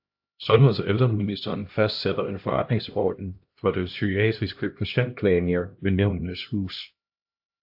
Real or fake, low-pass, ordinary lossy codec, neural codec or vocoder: fake; 5.4 kHz; AAC, 48 kbps; codec, 16 kHz, 1 kbps, X-Codec, HuBERT features, trained on LibriSpeech